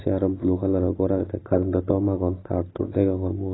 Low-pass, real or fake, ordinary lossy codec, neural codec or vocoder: 7.2 kHz; real; AAC, 16 kbps; none